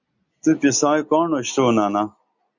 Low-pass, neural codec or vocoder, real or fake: 7.2 kHz; none; real